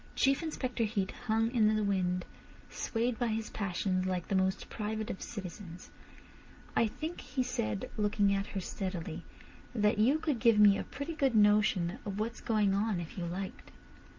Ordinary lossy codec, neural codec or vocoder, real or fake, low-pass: Opus, 24 kbps; none; real; 7.2 kHz